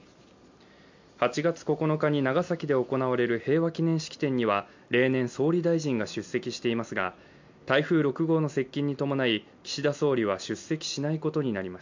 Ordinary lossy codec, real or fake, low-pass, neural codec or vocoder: MP3, 64 kbps; real; 7.2 kHz; none